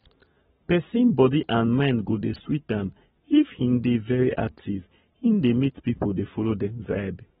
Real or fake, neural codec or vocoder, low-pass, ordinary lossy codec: fake; codec, 44.1 kHz, 7.8 kbps, Pupu-Codec; 19.8 kHz; AAC, 16 kbps